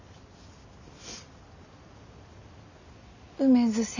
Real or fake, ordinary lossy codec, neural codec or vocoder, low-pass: real; none; none; 7.2 kHz